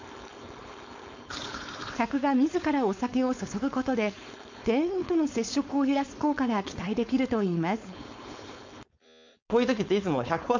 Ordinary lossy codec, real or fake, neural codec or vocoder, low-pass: MP3, 64 kbps; fake; codec, 16 kHz, 4.8 kbps, FACodec; 7.2 kHz